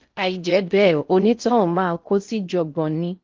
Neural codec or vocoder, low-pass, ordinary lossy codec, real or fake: codec, 16 kHz in and 24 kHz out, 0.6 kbps, FocalCodec, streaming, 4096 codes; 7.2 kHz; Opus, 24 kbps; fake